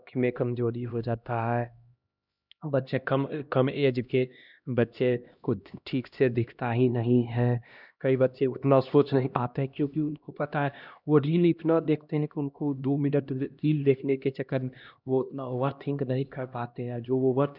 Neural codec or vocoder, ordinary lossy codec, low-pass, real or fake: codec, 16 kHz, 1 kbps, X-Codec, HuBERT features, trained on LibriSpeech; none; 5.4 kHz; fake